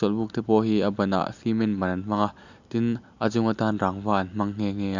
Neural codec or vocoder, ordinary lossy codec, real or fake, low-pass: none; none; real; 7.2 kHz